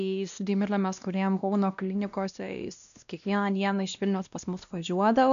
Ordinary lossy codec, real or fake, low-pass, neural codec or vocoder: MP3, 96 kbps; fake; 7.2 kHz; codec, 16 kHz, 1 kbps, X-Codec, WavLM features, trained on Multilingual LibriSpeech